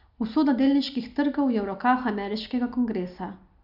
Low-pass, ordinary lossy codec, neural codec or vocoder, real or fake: 5.4 kHz; none; none; real